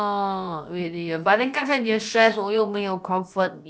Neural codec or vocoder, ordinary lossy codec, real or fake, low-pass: codec, 16 kHz, about 1 kbps, DyCAST, with the encoder's durations; none; fake; none